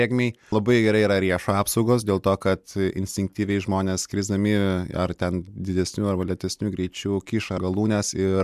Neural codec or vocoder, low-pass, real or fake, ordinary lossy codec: none; 14.4 kHz; real; MP3, 96 kbps